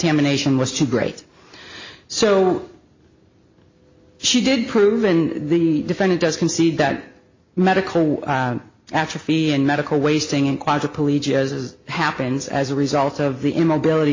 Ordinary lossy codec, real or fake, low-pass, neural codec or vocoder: MP3, 32 kbps; real; 7.2 kHz; none